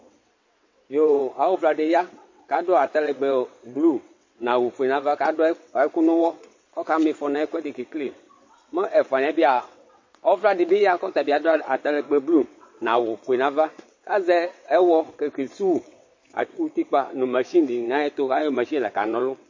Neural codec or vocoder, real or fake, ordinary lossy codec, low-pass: vocoder, 22.05 kHz, 80 mel bands, WaveNeXt; fake; MP3, 32 kbps; 7.2 kHz